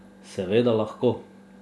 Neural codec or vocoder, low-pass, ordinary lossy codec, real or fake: none; none; none; real